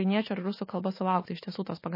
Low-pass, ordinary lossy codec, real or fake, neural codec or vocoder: 5.4 kHz; MP3, 24 kbps; fake; autoencoder, 48 kHz, 128 numbers a frame, DAC-VAE, trained on Japanese speech